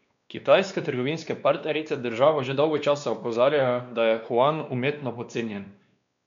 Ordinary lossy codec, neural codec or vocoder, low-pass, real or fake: MP3, 96 kbps; codec, 16 kHz, 2 kbps, X-Codec, WavLM features, trained on Multilingual LibriSpeech; 7.2 kHz; fake